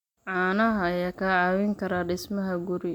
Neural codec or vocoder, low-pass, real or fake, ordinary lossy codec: none; 19.8 kHz; real; MP3, 96 kbps